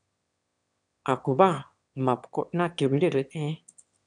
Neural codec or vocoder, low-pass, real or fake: autoencoder, 22.05 kHz, a latent of 192 numbers a frame, VITS, trained on one speaker; 9.9 kHz; fake